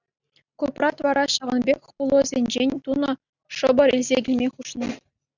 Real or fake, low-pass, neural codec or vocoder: real; 7.2 kHz; none